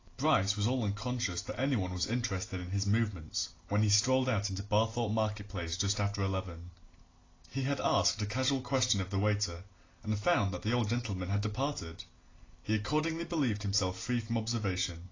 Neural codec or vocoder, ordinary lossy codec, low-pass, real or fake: none; AAC, 32 kbps; 7.2 kHz; real